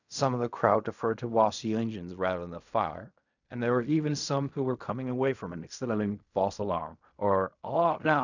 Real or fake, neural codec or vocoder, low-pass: fake; codec, 16 kHz in and 24 kHz out, 0.4 kbps, LongCat-Audio-Codec, fine tuned four codebook decoder; 7.2 kHz